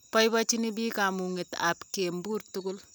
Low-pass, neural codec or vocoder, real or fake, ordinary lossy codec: none; none; real; none